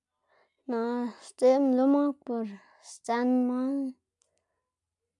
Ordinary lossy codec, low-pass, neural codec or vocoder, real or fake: none; 10.8 kHz; none; real